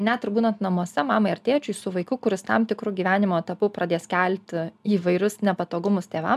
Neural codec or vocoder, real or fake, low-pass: none; real; 14.4 kHz